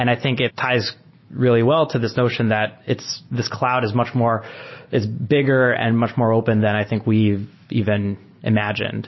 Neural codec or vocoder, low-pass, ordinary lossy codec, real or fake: none; 7.2 kHz; MP3, 24 kbps; real